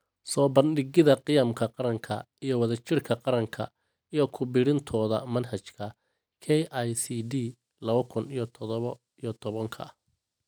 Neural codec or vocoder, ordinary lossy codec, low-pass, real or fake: none; none; none; real